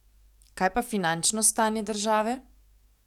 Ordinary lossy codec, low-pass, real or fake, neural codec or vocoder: none; 19.8 kHz; fake; codec, 44.1 kHz, 7.8 kbps, DAC